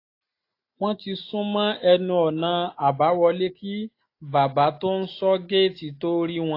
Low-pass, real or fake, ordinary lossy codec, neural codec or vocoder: 5.4 kHz; real; AAC, 32 kbps; none